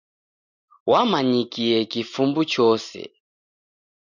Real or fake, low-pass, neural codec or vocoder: real; 7.2 kHz; none